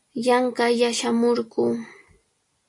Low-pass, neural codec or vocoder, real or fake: 10.8 kHz; none; real